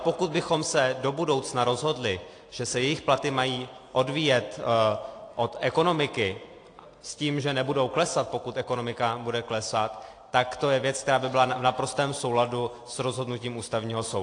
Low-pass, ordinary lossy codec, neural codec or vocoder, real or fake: 9.9 kHz; AAC, 48 kbps; none; real